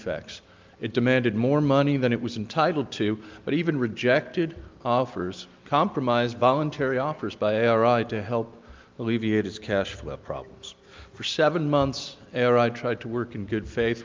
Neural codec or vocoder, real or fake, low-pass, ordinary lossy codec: none; real; 7.2 kHz; Opus, 24 kbps